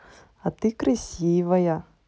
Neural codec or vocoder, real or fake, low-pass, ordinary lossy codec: none; real; none; none